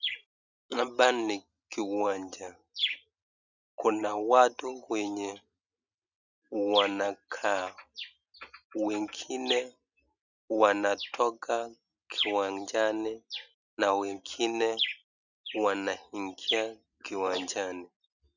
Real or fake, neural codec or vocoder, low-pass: real; none; 7.2 kHz